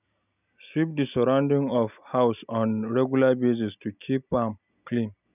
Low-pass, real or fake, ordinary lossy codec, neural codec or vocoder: 3.6 kHz; real; none; none